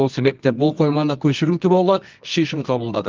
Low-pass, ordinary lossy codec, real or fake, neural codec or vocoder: 7.2 kHz; Opus, 24 kbps; fake; codec, 24 kHz, 0.9 kbps, WavTokenizer, medium music audio release